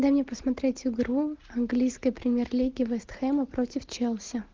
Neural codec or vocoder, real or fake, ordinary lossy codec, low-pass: none; real; Opus, 16 kbps; 7.2 kHz